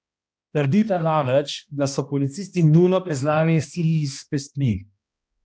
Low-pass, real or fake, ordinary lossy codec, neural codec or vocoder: none; fake; none; codec, 16 kHz, 1 kbps, X-Codec, HuBERT features, trained on balanced general audio